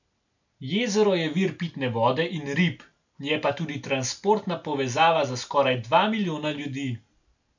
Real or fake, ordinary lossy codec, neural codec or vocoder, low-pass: real; none; none; 7.2 kHz